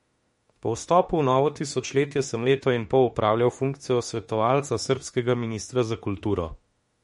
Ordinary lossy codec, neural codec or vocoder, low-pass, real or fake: MP3, 48 kbps; autoencoder, 48 kHz, 32 numbers a frame, DAC-VAE, trained on Japanese speech; 19.8 kHz; fake